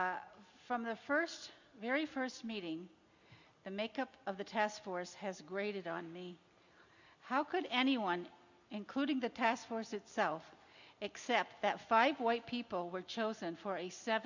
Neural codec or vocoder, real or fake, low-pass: none; real; 7.2 kHz